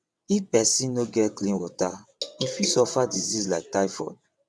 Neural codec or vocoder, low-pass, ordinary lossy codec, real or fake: vocoder, 22.05 kHz, 80 mel bands, WaveNeXt; none; none; fake